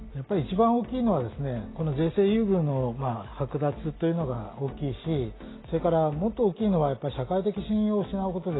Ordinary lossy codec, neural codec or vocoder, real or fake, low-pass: AAC, 16 kbps; none; real; 7.2 kHz